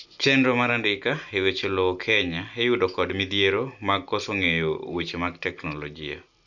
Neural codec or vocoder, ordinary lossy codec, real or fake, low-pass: none; none; real; 7.2 kHz